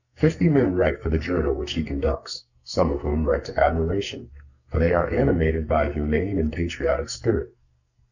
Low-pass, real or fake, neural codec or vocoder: 7.2 kHz; fake; codec, 44.1 kHz, 2.6 kbps, SNAC